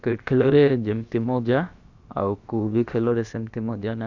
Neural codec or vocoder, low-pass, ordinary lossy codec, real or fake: codec, 16 kHz, about 1 kbps, DyCAST, with the encoder's durations; 7.2 kHz; Opus, 64 kbps; fake